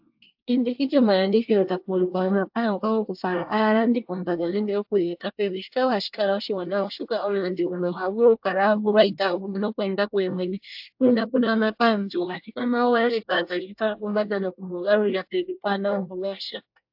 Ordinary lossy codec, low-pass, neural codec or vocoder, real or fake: AAC, 48 kbps; 5.4 kHz; codec, 24 kHz, 1 kbps, SNAC; fake